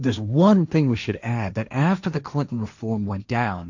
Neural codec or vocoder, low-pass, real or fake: codec, 16 kHz, 1.1 kbps, Voila-Tokenizer; 7.2 kHz; fake